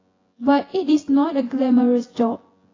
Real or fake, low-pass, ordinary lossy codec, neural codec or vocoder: fake; 7.2 kHz; AAC, 32 kbps; vocoder, 24 kHz, 100 mel bands, Vocos